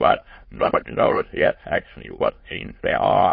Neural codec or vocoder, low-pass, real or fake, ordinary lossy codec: autoencoder, 22.05 kHz, a latent of 192 numbers a frame, VITS, trained on many speakers; 7.2 kHz; fake; MP3, 24 kbps